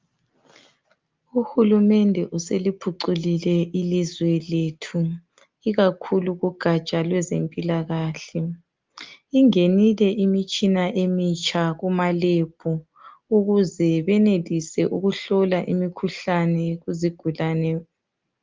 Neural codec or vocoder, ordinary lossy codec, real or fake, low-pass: none; Opus, 32 kbps; real; 7.2 kHz